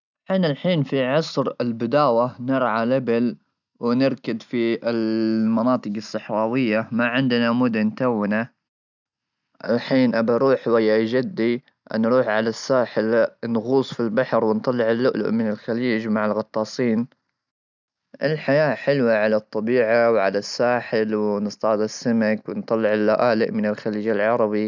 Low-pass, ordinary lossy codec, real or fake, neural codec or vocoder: 7.2 kHz; none; real; none